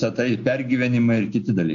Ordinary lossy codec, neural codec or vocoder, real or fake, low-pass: AAC, 64 kbps; none; real; 7.2 kHz